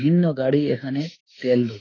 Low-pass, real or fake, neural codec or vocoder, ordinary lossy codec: 7.2 kHz; fake; autoencoder, 48 kHz, 32 numbers a frame, DAC-VAE, trained on Japanese speech; AAC, 32 kbps